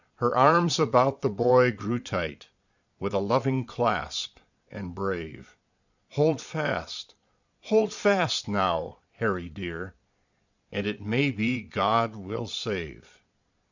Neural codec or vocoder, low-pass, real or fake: vocoder, 22.05 kHz, 80 mel bands, Vocos; 7.2 kHz; fake